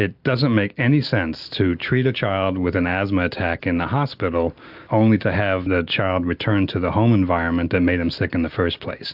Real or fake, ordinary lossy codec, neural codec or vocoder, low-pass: real; AAC, 48 kbps; none; 5.4 kHz